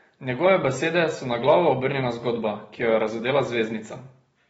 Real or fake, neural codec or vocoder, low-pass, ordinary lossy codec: real; none; 19.8 kHz; AAC, 24 kbps